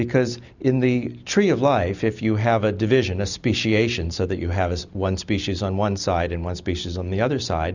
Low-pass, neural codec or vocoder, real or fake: 7.2 kHz; none; real